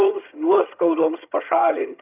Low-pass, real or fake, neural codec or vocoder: 3.6 kHz; fake; vocoder, 22.05 kHz, 80 mel bands, HiFi-GAN